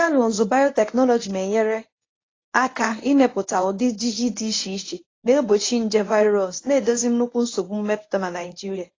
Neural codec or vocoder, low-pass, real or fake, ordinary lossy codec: codec, 24 kHz, 0.9 kbps, WavTokenizer, medium speech release version 1; 7.2 kHz; fake; AAC, 32 kbps